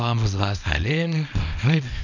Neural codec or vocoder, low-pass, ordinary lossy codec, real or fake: codec, 24 kHz, 0.9 kbps, WavTokenizer, small release; 7.2 kHz; none; fake